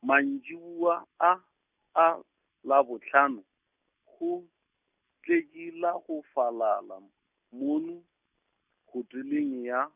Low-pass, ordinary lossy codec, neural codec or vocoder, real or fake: 3.6 kHz; none; none; real